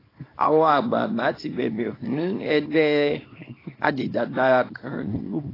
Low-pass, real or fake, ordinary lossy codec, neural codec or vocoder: 5.4 kHz; fake; AAC, 24 kbps; codec, 24 kHz, 0.9 kbps, WavTokenizer, small release